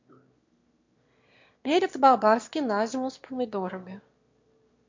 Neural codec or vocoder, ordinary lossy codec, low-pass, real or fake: autoencoder, 22.05 kHz, a latent of 192 numbers a frame, VITS, trained on one speaker; MP3, 48 kbps; 7.2 kHz; fake